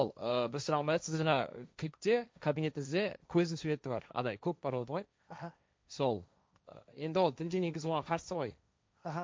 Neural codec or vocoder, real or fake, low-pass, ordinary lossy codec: codec, 16 kHz, 1.1 kbps, Voila-Tokenizer; fake; none; none